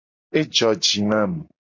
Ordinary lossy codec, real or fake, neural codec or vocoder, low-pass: MP3, 48 kbps; real; none; 7.2 kHz